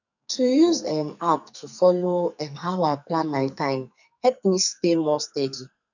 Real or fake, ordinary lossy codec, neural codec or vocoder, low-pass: fake; none; codec, 44.1 kHz, 2.6 kbps, SNAC; 7.2 kHz